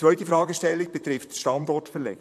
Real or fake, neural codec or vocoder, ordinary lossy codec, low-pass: fake; vocoder, 44.1 kHz, 128 mel bands, Pupu-Vocoder; AAC, 96 kbps; 14.4 kHz